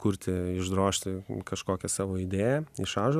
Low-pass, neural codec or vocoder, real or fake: 14.4 kHz; none; real